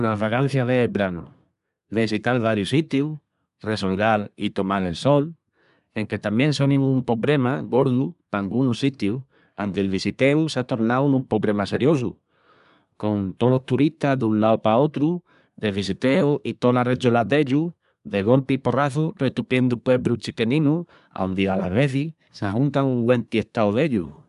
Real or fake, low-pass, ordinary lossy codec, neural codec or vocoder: fake; 10.8 kHz; none; codec, 24 kHz, 1 kbps, SNAC